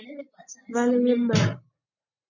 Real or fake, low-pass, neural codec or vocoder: real; 7.2 kHz; none